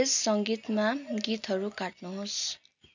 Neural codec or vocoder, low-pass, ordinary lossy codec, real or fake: none; 7.2 kHz; none; real